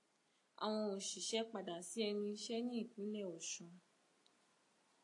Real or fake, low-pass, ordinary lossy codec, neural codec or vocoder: real; 10.8 kHz; AAC, 48 kbps; none